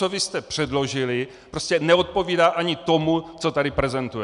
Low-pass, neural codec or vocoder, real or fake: 10.8 kHz; none; real